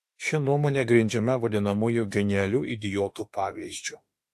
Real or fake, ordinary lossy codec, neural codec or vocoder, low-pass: fake; AAC, 64 kbps; autoencoder, 48 kHz, 32 numbers a frame, DAC-VAE, trained on Japanese speech; 14.4 kHz